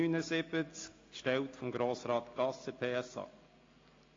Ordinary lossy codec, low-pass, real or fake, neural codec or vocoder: AAC, 32 kbps; 7.2 kHz; real; none